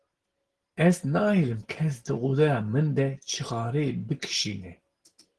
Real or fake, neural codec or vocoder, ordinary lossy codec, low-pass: real; none; Opus, 16 kbps; 10.8 kHz